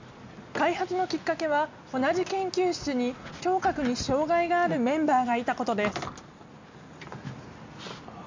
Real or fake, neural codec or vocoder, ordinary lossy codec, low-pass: real; none; MP3, 64 kbps; 7.2 kHz